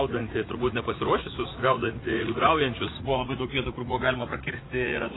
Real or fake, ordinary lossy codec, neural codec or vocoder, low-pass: fake; AAC, 16 kbps; vocoder, 44.1 kHz, 80 mel bands, Vocos; 7.2 kHz